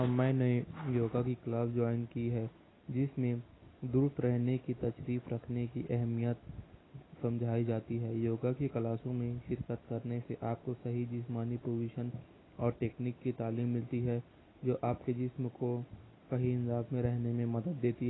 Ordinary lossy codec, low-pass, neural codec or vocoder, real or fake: AAC, 16 kbps; 7.2 kHz; none; real